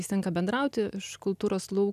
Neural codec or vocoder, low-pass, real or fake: none; 14.4 kHz; real